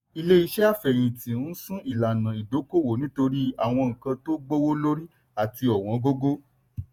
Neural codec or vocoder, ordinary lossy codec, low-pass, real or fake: vocoder, 48 kHz, 128 mel bands, Vocos; none; 19.8 kHz; fake